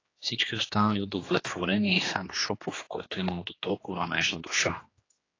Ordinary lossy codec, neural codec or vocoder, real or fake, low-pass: AAC, 32 kbps; codec, 16 kHz, 2 kbps, X-Codec, HuBERT features, trained on general audio; fake; 7.2 kHz